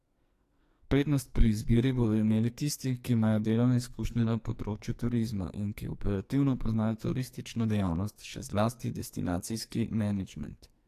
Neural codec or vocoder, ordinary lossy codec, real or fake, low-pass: codec, 44.1 kHz, 2.6 kbps, SNAC; AAC, 64 kbps; fake; 14.4 kHz